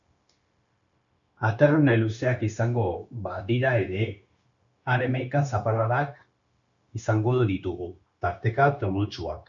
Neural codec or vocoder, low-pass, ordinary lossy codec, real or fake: codec, 16 kHz, 0.9 kbps, LongCat-Audio-Codec; 7.2 kHz; MP3, 96 kbps; fake